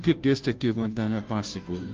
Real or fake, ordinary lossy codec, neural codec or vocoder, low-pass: fake; Opus, 24 kbps; codec, 16 kHz, 0.5 kbps, FunCodec, trained on Chinese and English, 25 frames a second; 7.2 kHz